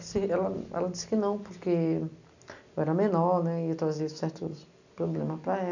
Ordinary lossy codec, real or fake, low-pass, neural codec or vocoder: none; real; 7.2 kHz; none